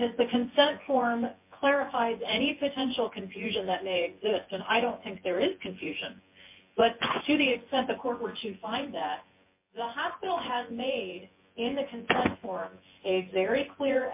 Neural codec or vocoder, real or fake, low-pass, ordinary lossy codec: vocoder, 24 kHz, 100 mel bands, Vocos; fake; 3.6 kHz; MP3, 24 kbps